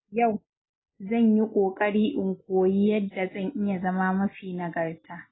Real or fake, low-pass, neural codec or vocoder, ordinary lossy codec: real; 7.2 kHz; none; AAC, 16 kbps